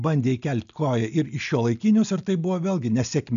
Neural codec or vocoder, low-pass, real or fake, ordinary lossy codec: none; 7.2 kHz; real; AAC, 96 kbps